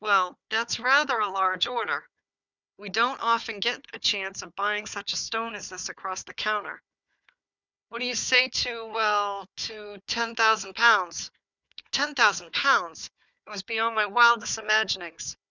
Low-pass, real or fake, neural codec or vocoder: 7.2 kHz; fake; codec, 16 kHz, 4 kbps, FunCodec, trained on Chinese and English, 50 frames a second